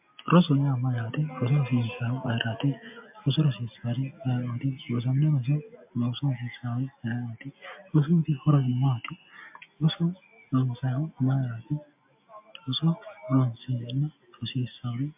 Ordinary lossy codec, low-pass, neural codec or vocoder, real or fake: MP3, 32 kbps; 3.6 kHz; none; real